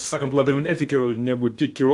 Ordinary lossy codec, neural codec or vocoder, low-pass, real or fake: AAC, 64 kbps; codec, 16 kHz in and 24 kHz out, 0.8 kbps, FocalCodec, streaming, 65536 codes; 10.8 kHz; fake